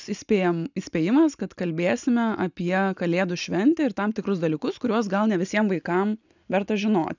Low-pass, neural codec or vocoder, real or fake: 7.2 kHz; none; real